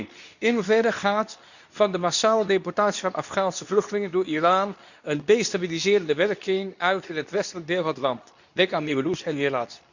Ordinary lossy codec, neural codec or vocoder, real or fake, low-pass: none; codec, 24 kHz, 0.9 kbps, WavTokenizer, medium speech release version 2; fake; 7.2 kHz